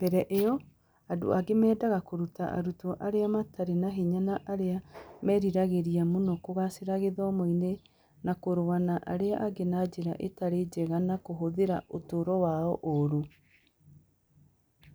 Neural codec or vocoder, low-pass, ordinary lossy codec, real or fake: none; none; none; real